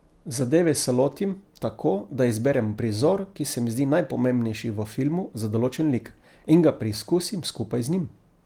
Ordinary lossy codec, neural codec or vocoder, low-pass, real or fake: Opus, 24 kbps; none; 19.8 kHz; real